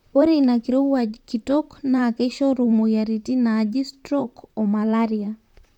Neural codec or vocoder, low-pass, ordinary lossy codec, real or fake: vocoder, 44.1 kHz, 128 mel bands, Pupu-Vocoder; 19.8 kHz; none; fake